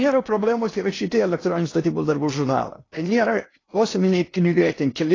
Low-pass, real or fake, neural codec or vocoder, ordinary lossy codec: 7.2 kHz; fake; codec, 16 kHz in and 24 kHz out, 0.8 kbps, FocalCodec, streaming, 65536 codes; AAC, 32 kbps